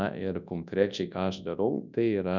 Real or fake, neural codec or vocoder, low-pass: fake; codec, 24 kHz, 0.9 kbps, WavTokenizer, large speech release; 7.2 kHz